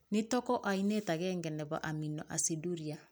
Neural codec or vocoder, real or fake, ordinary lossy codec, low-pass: none; real; none; none